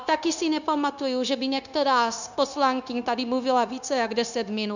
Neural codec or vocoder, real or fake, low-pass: codec, 16 kHz, 0.9 kbps, LongCat-Audio-Codec; fake; 7.2 kHz